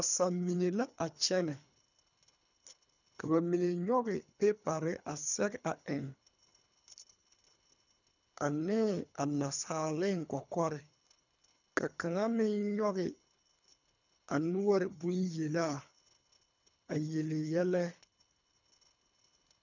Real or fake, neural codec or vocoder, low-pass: fake; codec, 24 kHz, 3 kbps, HILCodec; 7.2 kHz